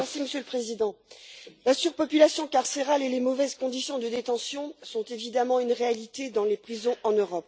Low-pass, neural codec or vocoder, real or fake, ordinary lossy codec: none; none; real; none